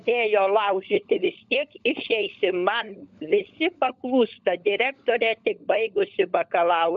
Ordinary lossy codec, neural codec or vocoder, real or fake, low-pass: MP3, 64 kbps; codec, 16 kHz, 16 kbps, FunCodec, trained on LibriTTS, 50 frames a second; fake; 7.2 kHz